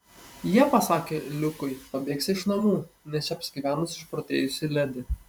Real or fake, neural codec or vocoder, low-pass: fake; vocoder, 44.1 kHz, 128 mel bands every 512 samples, BigVGAN v2; 19.8 kHz